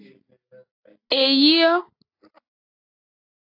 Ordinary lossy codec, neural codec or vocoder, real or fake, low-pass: AAC, 32 kbps; none; real; 5.4 kHz